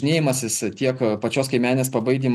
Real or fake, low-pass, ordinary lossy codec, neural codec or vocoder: real; 14.4 kHz; MP3, 96 kbps; none